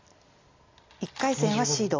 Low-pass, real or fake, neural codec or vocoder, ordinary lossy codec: 7.2 kHz; real; none; AAC, 32 kbps